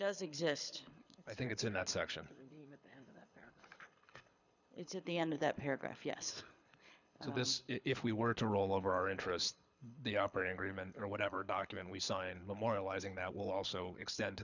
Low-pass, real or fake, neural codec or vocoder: 7.2 kHz; fake; codec, 24 kHz, 6 kbps, HILCodec